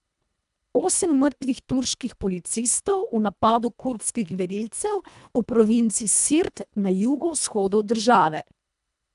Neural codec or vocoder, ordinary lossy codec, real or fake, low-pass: codec, 24 kHz, 1.5 kbps, HILCodec; none; fake; 10.8 kHz